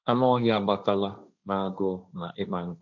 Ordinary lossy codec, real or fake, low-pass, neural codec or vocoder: none; fake; 7.2 kHz; codec, 16 kHz, 1.1 kbps, Voila-Tokenizer